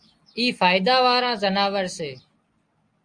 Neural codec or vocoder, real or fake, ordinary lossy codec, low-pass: none; real; Opus, 32 kbps; 9.9 kHz